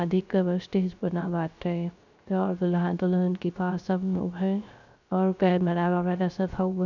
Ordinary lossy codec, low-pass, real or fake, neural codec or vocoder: none; 7.2 kHz; fake; codec, 16 kHz, 0.3 kbps, FocalCodec